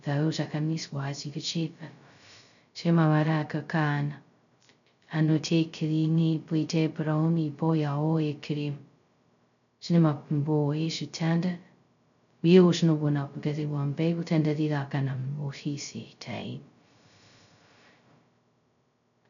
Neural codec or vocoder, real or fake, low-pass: codec, 16 kHz, 0.2 kbps, FocalCodec; fake; 7.2 kHz